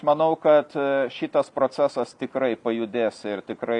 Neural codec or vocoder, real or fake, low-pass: none; real; 10.8 kHz